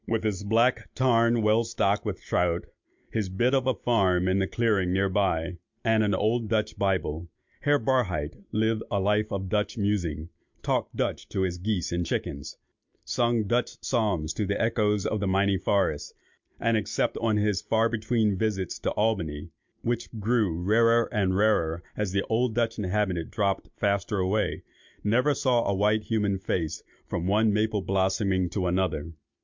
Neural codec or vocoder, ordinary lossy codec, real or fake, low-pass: none; MP3, 64 kbps; real; 7.2 kHz